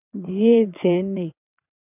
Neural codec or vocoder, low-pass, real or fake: vocoder, 22.05 kHz, 80 mel bands, WaveNeXt; 3.6 kHz; fake